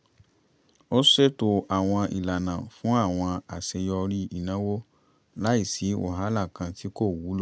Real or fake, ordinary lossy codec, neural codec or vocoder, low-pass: real; none; none; none